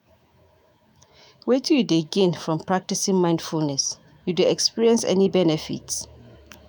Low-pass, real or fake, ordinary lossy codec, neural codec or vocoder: none; fake; none; autoencoder, 48 kHz, 128 numbers a frame, DAC-VAE, trained on Japanese speech